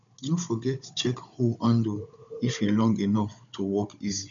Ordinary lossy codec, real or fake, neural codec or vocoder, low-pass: none; fake; codec, 16 kHz, 16 kbps, FunCodec, trained on Chinese and English, 50 frames a second; 7.2 kHz